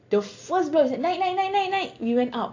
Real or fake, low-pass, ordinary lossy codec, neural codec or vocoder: real; 7.2 kHz; AAC, 48 kbps; none